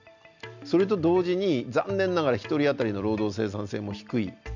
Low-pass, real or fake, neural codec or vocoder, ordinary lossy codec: 7.2 kHz; real; none; none